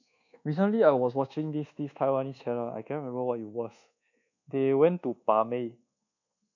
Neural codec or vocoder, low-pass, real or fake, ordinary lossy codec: codec, 24 kHz, 1.2 kbps, DualCodec; 7.2 kHz; fake; none